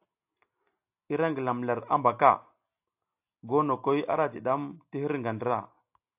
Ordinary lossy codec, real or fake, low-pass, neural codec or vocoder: AAC, 32 kbps; real; 3.6 kHz; none